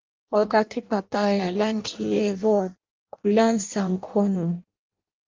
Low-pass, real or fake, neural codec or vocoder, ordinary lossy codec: 7.2 kHz; fake; codec, 16 kHz in and 24 kHz out, 0.6 kbps, FireRedTTS-2 codec; Opus, 24 kbps